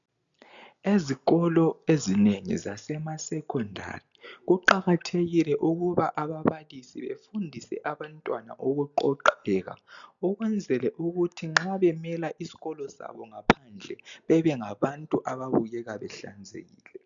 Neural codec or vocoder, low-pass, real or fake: none; 7.2 kHz; real